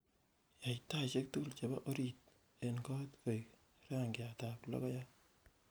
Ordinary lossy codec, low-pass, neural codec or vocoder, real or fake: none; none; none; real